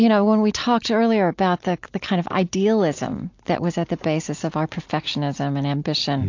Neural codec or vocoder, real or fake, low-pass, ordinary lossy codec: none; real; 7.2 kHz; AAC, 48 kbps